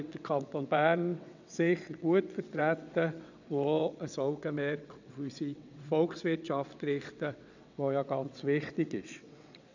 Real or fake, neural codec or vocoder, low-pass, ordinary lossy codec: fake; vocoder, 22.05 kHz, 80 mel bands, WaveNeXt; 7.2 kHz; none